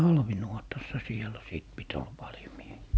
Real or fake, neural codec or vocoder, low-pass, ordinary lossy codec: real; none; none; none